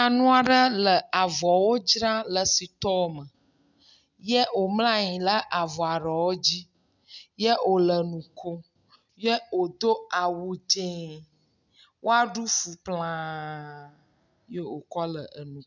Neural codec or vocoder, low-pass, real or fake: none; 7.2 kHz; real